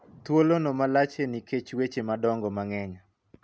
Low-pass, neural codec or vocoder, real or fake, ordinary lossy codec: none; none; real; none